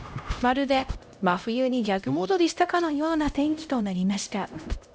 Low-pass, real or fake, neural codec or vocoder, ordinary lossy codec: none; fake; codec, 16 kHz, 0.5 kbps, X-Codec, HuBERT features, trained on LibriSpeech; none